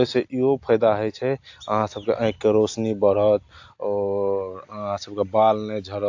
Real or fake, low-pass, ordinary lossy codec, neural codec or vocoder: real; 7.2 kHz; AAC, 48 kbps; none